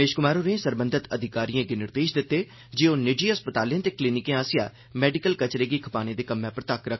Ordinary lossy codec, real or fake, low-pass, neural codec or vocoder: MP3, 24 kbps; real; 7.2 kHz; none